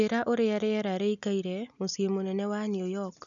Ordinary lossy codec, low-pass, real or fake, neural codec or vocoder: none; 7.2 kHz; real; none